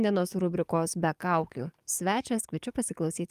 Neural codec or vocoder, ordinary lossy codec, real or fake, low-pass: codec, 44.1 kHz, 7.8 kbps, DAC; Opus, 32 kbps; fake; 14.4 kHz